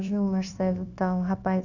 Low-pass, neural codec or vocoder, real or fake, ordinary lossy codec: 7.2 kHz; codec, 16 kHz, 0.9 kbps, LongCat-Audio-Codec; fake; none